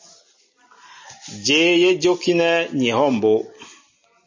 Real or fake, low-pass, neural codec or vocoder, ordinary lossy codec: real; 7.2 kHz; none; MP3, 32 kbps